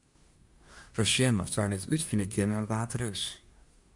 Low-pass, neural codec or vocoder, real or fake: 10.8 kHz; codec, 24 kHz, 1 kbps, SNAC; fake